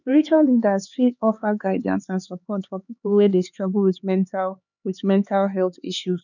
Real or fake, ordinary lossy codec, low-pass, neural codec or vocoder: fake; none; 7.2 kHz; codec, 16 kHz, 4 kbps, X-Codec, HuBERT features, trained on LibriSpeech